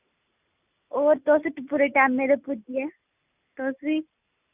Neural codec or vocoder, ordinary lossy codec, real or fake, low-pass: none; none; real; 3.6 kHz